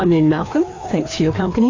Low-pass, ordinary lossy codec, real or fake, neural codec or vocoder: 7.2 kHz; AAC, 32 kbps; fake; codec, 16 kHz, 2 kbps, FreqCodec, larger model